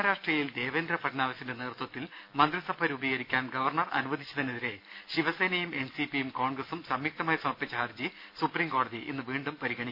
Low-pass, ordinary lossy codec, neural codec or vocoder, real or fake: 5.4 kHz; none; none; real